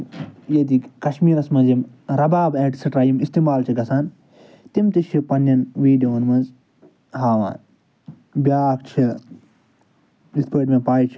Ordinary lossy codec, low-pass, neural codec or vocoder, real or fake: none; none; none; real